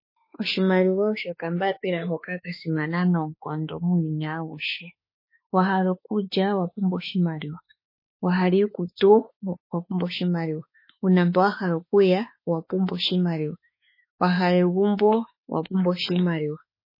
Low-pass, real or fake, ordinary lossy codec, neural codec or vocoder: 5.4 kHz; fake; MP3, 24 kbps; autoencoder, 48 kHz, 32 numbers a frame, DAC-VAE, trained on Japanese speech